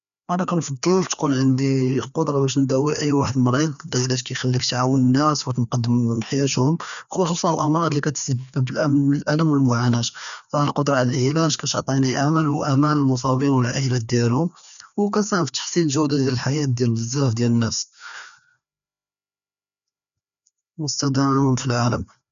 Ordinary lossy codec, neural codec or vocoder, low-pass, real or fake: none; codec, 16 kHz, 2 kbps, FreqCodec, larger model; 7.2 kHz; fake